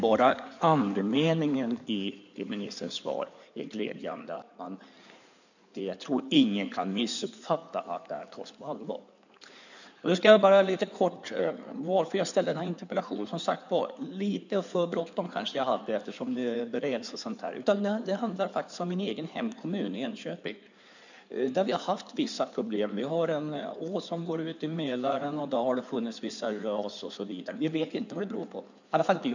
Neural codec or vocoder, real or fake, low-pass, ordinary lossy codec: codec, 16 kHz in and 24 kHz out, 2.2 kbps, FireRedTTS-2 codec; fake; 7.2 kHz; none